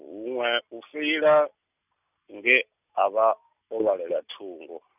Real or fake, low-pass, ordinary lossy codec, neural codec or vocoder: real; 3.6 kHz; none; none